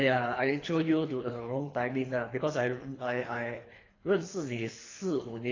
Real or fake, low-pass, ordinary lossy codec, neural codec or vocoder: fake; 7.2 kHz; AAC, 32 kbps; codec, 24 kHz, 3 kbps, HILCodec